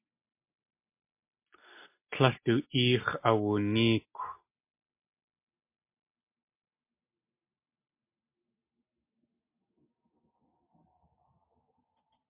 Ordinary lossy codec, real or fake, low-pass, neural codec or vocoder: MP3, 24 kbps; real; 3.6 kHz; none